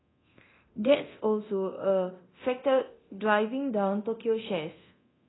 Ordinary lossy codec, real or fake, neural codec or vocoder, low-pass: AAC, 16 kbps; fake; codec, 24 kHz, 0.9 kbps, DualCodec; 7.2 kHz